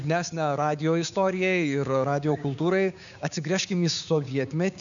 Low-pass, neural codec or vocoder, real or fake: 7.2 kHz; codec, 16 kHz, 6 kbps, DAC; fake